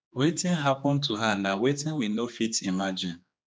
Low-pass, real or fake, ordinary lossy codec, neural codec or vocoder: none; fake; none; codec, 16 kHz, 2 kbps, X-Codec, HuBERT features, trained on general audio